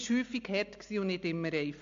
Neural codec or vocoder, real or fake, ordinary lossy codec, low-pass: none; real; MP3, 64 kbps; 7.2 kHz